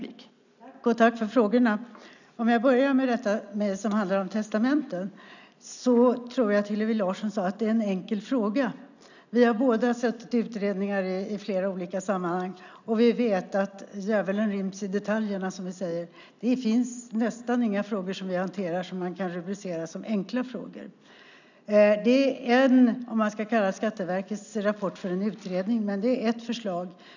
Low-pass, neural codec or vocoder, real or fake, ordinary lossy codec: 7.2 kHz; none; real; none